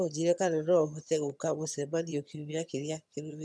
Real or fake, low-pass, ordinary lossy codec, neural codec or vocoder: fake; none; none; vocoder, 22.05 kHz, 80 mel bands, HiFi-GAN